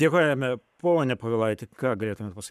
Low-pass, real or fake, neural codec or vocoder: 14.4 kHz; fake; codec, 44.1 kHz, 7.8 kbps, Pupu-Codec